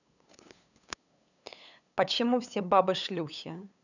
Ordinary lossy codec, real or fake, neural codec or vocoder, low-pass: none; fake; codec, 16 kHz, 8 kbps, FunCodec, trained on LibriTTS, 25 frames a second; 7.2 kHz